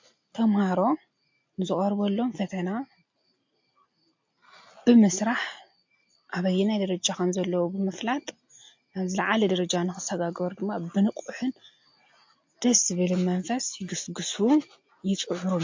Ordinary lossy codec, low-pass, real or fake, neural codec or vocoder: MP3, 48 kbps; 7.2 kHz; real; none